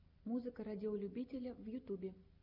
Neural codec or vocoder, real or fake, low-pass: none; real; 5.4 kHz